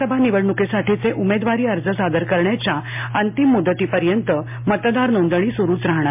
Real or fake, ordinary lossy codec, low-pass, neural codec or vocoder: real; MP3, 32 kbps; 3.6 kHz; none